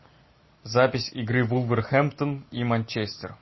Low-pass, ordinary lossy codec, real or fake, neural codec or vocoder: 7.2 kHz; MP3, 24 kbps; real; none